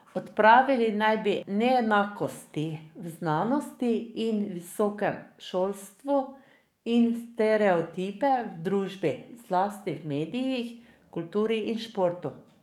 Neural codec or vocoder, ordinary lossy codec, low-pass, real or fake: codec, 44.1 kHz, 7.8 kbps, DAC; none; 19.8 kHz; fake